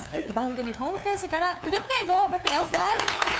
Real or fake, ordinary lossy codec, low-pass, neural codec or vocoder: fake; none; none; codec, 16 kHz, 2 kbps, FunCodec, trained on LibriTTS, 25 frames a second